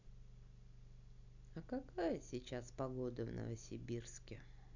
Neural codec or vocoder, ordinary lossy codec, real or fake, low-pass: none; none; real; 7.2 kHz